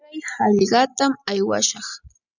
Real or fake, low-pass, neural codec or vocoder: real; 7.2 kHz; none